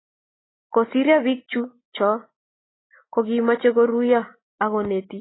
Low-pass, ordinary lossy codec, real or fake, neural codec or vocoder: 7.2 kHz; AAC, 16 kbps; real; none